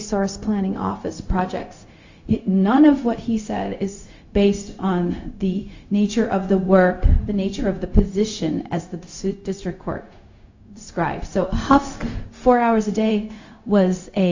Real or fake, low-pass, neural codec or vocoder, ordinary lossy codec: fake; 7.2 kHz; codec, 16 kHz, 0.4 kbps, LongCat-Audio-Codec; AAC, 48 kbps